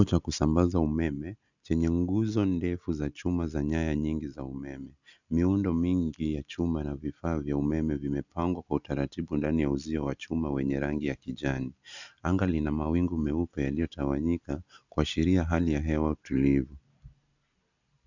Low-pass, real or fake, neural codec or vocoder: 7.2 kHz; real; none